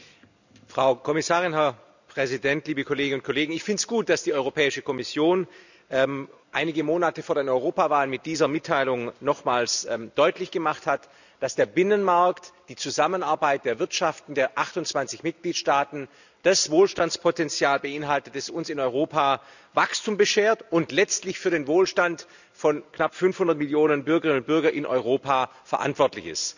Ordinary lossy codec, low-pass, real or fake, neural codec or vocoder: none; 7.2 kHz; real; none